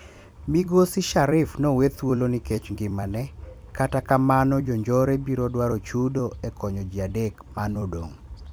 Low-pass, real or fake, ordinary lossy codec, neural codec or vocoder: none; fake; none; vocoder, 44.1 kHz, 128 mel bands every 256 samples, BigVGAN v2